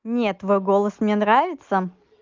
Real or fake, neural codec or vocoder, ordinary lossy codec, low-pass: real; none; Opus, 32 kbps; 7.2 kHz